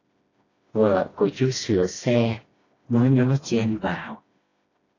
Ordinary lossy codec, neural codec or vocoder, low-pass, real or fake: AAC, 32 kbps; codec, 16 kHz, 1 kbps, FreqCodec, smaller model; 7.2 kHz; fake